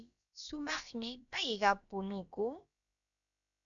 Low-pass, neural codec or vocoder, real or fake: 7.2 kHz; codec, 16 kHz, about 1 kbps, DyCAST, with the encoder's durations; fake